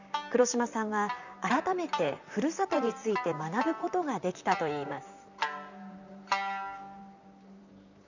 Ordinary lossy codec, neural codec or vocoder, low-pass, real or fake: none; vocoder, 44.1 kHz, 128 mel bands, Pupu-Vocoder; 7.2 kHz; fake